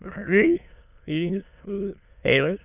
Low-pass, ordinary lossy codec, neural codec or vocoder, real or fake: 3.6 kHz; none; autoencoder, 22.05 kHz, a latent of 192 numbers a frame, VITS, trained on many speakers; fake